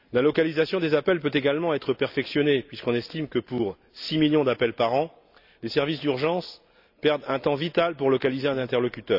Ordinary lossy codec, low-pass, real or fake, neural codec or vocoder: none; 5.4 kHz; real; none